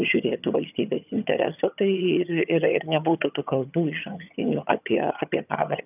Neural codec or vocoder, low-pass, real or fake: vocoder, 22.05 kHz, 80 mel bands, HiFi-GAN; 3.6 kHz; fake